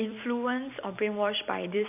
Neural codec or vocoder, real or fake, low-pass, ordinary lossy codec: vocoder, 44.1 kHz, 128 mel bands every 256 samples, BigVGAN v2; fake; 3.6 kHz; AAC, 32 kbps